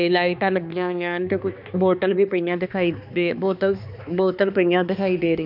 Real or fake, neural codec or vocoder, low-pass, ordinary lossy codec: fake; codec, 16 kHz, 2 kbps, X-Codec, HuBERT features, trained on balanced general audio; 5.4 kHz; none